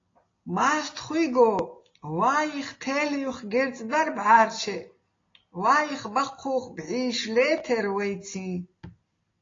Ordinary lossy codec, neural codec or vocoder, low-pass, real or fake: AAC, 32 kbps; none; 7.2 kHz; real